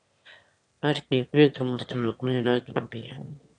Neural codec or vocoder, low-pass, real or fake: autoencoder, 22.05 kHz, a latent of 192 numbers a frame, VITS, trained on one speaker; 9.9 kHz; fake